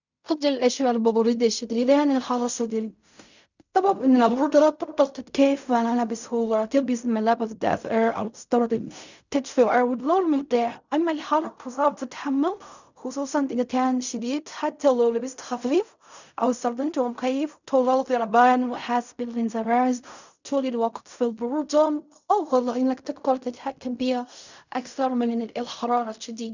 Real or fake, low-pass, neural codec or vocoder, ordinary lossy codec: fake; 7.2 kHz; codec, 16 kHz in and 24 kHz out, 0.4 kbps, LongCat-Audio-Codec, fine tuned four codebook decoder; none